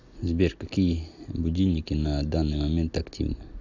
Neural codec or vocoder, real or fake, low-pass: none; real; 7.2 kHz